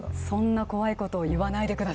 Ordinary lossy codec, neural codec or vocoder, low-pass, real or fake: none; none; none; real